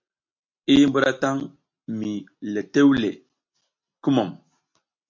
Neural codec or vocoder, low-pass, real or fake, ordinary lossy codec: none; 7.2 kHz; real; MP3, 48 kbps